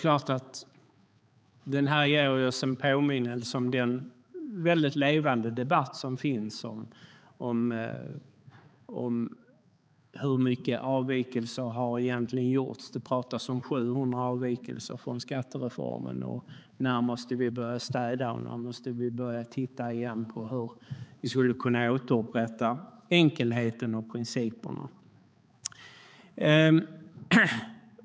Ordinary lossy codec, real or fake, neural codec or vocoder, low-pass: none; fake; codec, 16 kHz, 4 kbps, X-Codec, HuBERT features, trained on balanced general audio; none